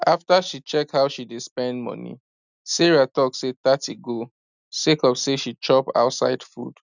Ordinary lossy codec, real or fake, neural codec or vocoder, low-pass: none; real; none; 7.2 kHz